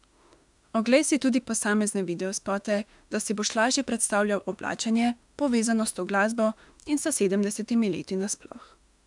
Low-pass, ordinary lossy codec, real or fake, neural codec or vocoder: 10.8 kHz; none; fake; autoencoder, 48 kHz, 32 numbers a frame, DAC-VAE, trained on Japanese speech